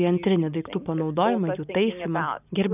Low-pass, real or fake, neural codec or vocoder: 3.6 kHz; real; none